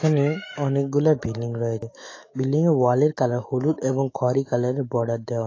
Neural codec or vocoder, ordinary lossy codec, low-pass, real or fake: autoencoder, 48 kHz, 128 numbers a frame, DAC-VAE, trained on Japanese speech; AAC, 32 kbps; 7.2 kHz; fake